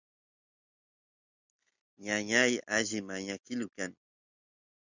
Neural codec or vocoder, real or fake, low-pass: none; real; 7.2 kHz